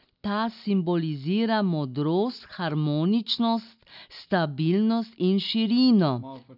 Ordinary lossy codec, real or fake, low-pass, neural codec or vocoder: none; real; 5.4 kHz; none